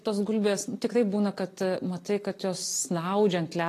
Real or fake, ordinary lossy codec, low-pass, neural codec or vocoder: real; AAC, 48 kbps; 14.4 kHz; none